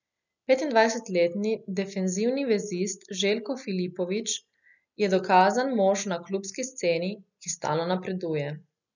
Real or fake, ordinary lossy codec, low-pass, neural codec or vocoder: real; none; 7.2 kHz; none